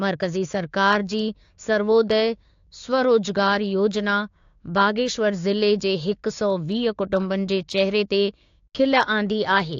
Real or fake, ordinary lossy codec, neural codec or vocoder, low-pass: fake; AAC, 48 kbps; codec, 16 kHz, 6 kbps, DAC; 7.2 kHz